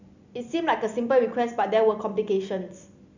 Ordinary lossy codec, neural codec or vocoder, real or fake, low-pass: none; none; real; 7.2 kHz